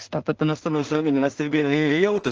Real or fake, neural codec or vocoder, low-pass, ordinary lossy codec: fake; codec, 16 kHz in and 24 kHz out, 0.4 kbps, LongCat-Audio-Codec, two codebook decoder; 7.2 kHz; Opus, 16 kbps